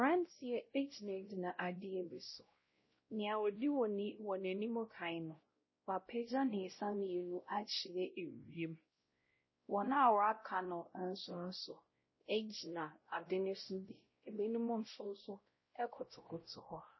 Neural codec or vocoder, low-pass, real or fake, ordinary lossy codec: codec, 16 kHz, 0.5 kbps, X-Codec, WavLM features, trained on Multilingual LibriSpeech; 7.2 kHz; fake; MP3, 24 kbps